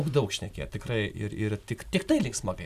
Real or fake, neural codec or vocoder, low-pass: real; none; 14.4 kHz